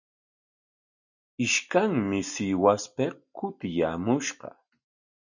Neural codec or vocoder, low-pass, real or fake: none; 7.2 kHz; real